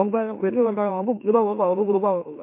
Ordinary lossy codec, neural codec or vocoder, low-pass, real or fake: MP3, 24 kbps; autoencoder, 44.1 kHz, a latent of 192 numbers a frame, MeloTTS; 3.6 kHz; fake